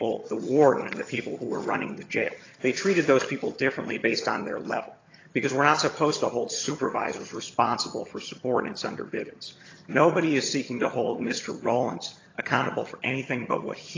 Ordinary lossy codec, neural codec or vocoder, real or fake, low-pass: AAC, 32 kbps; vocoder, 22.05 kHz, 80 mel bands, HiFi-GAN; fake; 7.2 kHz